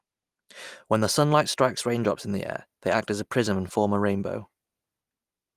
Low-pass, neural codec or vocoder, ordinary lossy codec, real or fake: 14.4 kHz; none; Opus, 32 kbps; real